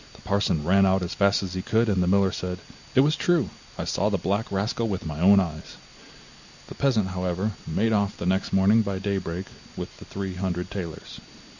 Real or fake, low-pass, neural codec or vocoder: real; 7.2 kHz; none